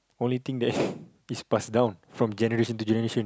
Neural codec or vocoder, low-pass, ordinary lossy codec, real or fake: none; none; none; real